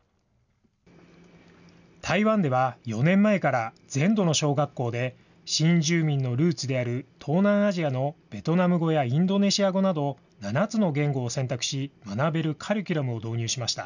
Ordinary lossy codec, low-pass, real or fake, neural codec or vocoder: none; 7.2 kHz; real; none